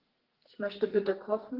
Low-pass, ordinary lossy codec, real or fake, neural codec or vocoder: 5.4 kHz; Opus, 16 kbps; fake; codec, 44.1 kHz, 2.6 kbps, SNAC